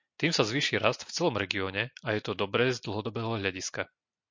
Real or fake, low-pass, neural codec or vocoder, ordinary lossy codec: real; 7.2 kHz; none; MP3, 48 kbps